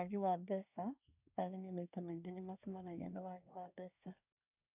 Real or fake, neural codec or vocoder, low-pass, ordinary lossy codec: fake; codec, 24 kHz, 1 kbps, SNAC; 3.6 kHz; none